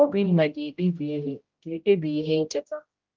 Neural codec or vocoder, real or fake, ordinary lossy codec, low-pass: codec, 16 kHz, 0.5 kbps, X-Codec, HuBERT features, trained on general audio; fake; Opus, 32 kbps; 7.2 kHz